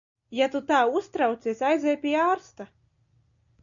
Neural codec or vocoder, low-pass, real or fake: none; 7.2 kHz; real